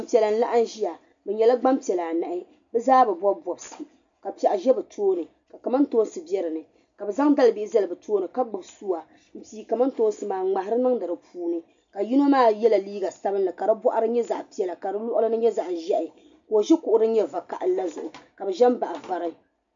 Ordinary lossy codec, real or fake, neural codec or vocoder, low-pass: MP3, 64 kbps; real; none; 7.2 kHz